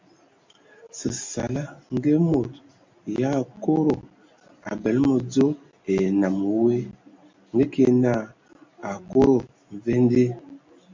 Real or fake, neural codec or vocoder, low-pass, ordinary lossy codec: real; none; 7.2 kHz; MP3, 48 kbps